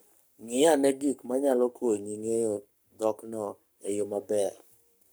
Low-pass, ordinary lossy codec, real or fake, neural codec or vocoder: none; none; fake; codec, 44.1 kHz, 7.8 kbps, Pupu-Codec